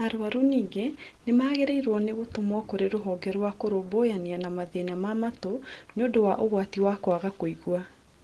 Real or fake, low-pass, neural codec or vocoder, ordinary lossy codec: real; 10.8 kHz; none; Opus, 16 kbps